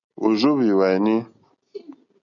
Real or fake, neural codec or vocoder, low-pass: real; none; 7.2 kHz